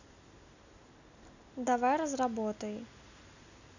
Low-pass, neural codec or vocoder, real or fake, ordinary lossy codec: 7.2 kHz; none; real; none